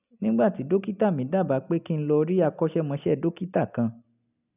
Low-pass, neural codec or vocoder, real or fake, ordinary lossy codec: 3.6 kHz; none; real; none